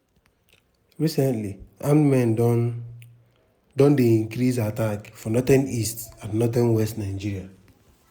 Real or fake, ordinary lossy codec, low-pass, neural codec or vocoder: real; none; none; none